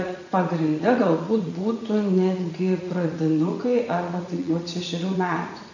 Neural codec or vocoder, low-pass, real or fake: vocoder, 44.1 kHz, 80 mel bands, Vocos; 7.2 kHz; fake